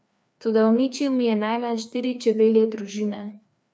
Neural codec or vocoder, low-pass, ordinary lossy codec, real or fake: codec, 16 kHz, 2 kbps, FreqCodec, larger model; none; none; fake